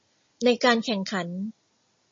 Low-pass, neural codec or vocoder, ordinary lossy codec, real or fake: 7.2 kHz; none; MP3, 32 kbps; real